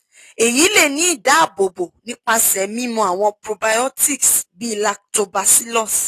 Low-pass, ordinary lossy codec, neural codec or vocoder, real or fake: 14.4 kHz; AAC, 48 kbps; none; real